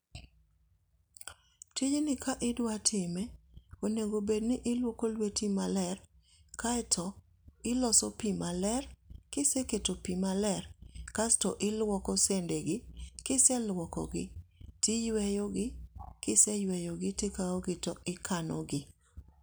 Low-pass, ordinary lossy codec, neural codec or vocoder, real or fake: none; none; none; real